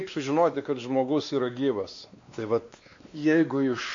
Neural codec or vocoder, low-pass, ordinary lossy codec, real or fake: codec, 16 kHz, 2 kbps, X-Codec, WavLM features, trained on Multilingual LibriSpeech; 7.2 kHz; AAC, 48 kbps; fake